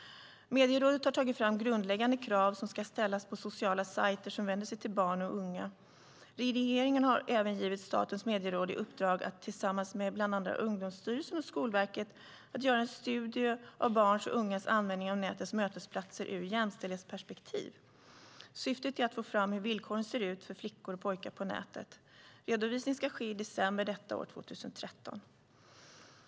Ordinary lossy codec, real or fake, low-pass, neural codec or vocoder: none; real; none; none